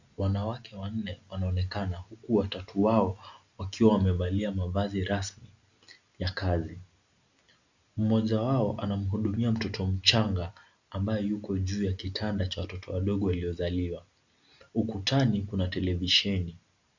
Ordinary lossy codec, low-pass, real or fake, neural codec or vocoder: Opus, 64 kbps; 7.2 kHz; real; none